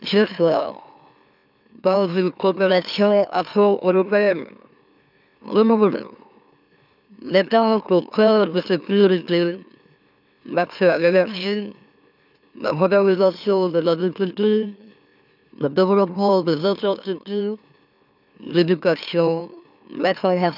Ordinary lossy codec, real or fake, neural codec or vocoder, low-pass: none; fake; autoencoder, 44.1 kHz, a latent of 192 numbers a frame, MeloTTS; 5.4 kHz